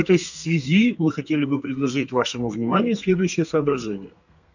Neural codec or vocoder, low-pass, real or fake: codec, 32 kHz, 1.9 kbps, SNAC; 7.2 kHz; fake